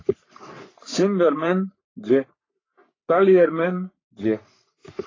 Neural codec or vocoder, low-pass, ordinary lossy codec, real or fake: codec, 44.1 kHz, 3.4 kbps, Pupu-Codec; 7.2 kHz; AAC, 32 kbps; fake